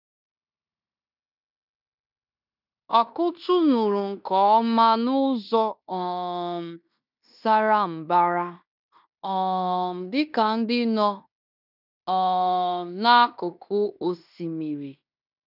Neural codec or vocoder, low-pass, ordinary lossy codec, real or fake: codec, 16 kHz in and 24 kHz out, 0.9 kbps, LongCat-Audio-Codec, fine tuned four codebook decoder; 5.4 kHz; none; fake